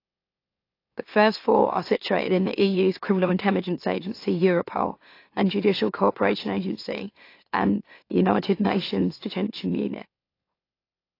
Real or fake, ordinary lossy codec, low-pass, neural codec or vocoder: fake; AAC, 32 kbps; 5.4 kHz; autoencoder, 44.1 kHz, a latent of 192 numbers a frame, MeloTTS